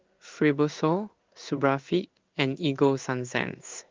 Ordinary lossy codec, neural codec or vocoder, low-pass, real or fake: Opus, 24 kbps; codec, 16 kHz in and 24 kHz out, 1 kbps, XY-Tokenizer; 7.2 kHz; fake